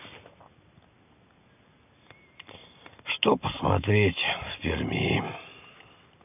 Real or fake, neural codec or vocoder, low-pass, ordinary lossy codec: real; none; 3.6 kHz; none